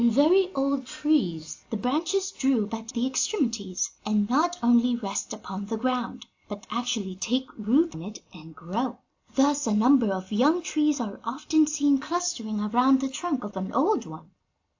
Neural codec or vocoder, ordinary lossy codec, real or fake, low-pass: none; AAC, 48 kbps; real; 7.2 kHz